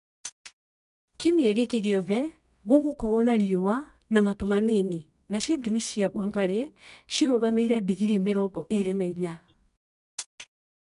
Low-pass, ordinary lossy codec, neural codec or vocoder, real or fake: 10.8 kHz; none; codec, 24 kHz, 0.9 kbps, WavTokenizer, medium music audio release; fake